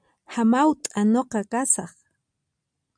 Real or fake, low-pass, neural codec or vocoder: real; 9.9 kHz; none